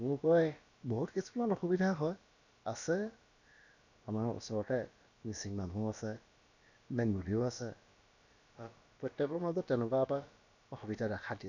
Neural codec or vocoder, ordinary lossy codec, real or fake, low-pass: codec, 16 kHz, about 1 kbps, DyCAST, with the encoder's durations; none; fake; 7.2 kHz